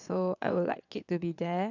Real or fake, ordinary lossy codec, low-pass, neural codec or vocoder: fake; none; 7.2 kHz; vocoder, 22.05 kHz, 80 mel bands, WaveNeXt